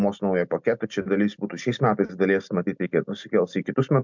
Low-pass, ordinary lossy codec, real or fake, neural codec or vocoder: 7.2 kHz; MP3, 64 kbps; real; none